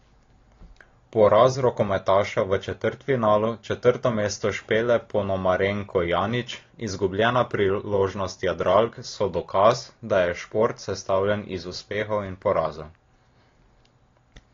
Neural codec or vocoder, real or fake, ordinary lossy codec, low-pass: none; real; AAC, 32 kbps; 7.2 kHz